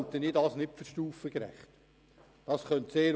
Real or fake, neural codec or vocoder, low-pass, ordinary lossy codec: real; none; none; none